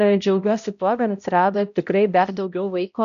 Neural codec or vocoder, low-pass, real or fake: codec, 16 kHz, 0.5 kbps, X-Codec, HuBERT features, trained on balanced general audio; 7.2 kHz; fake